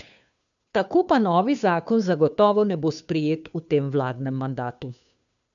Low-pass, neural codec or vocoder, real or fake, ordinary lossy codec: 7.2 kHz; codec, 16 kHz, 2 kbps, FunCodec, trained on Chinese and English, 25 frames a second; fake; none